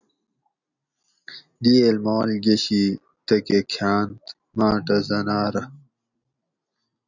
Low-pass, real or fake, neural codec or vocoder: 7.2 kHz; real; none